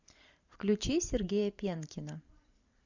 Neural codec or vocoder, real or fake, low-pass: vocoder, 44.1 kHz, 128 mel bands every 512 samples, BigVGAN v2; fake; 7.2 kHz